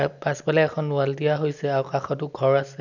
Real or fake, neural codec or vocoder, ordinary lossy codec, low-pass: real; none; none; 7.2 kHz